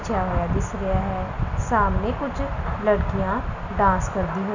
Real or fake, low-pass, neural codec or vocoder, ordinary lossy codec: real; 7.2 kHz; none; none